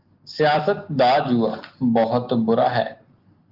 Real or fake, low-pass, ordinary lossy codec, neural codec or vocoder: real; 5.4 kHz; Opus, 32 kbps; none